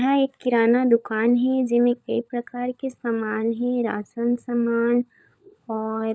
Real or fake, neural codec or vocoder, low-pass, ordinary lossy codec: fake; codec, 16 kHz, 16 kbps, FunCodec, trained on LibriTTS, 50 frames a second; none; none